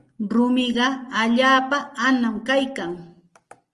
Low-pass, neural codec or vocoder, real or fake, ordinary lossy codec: 10.8 kHz; none; real; Opus, 24 kbps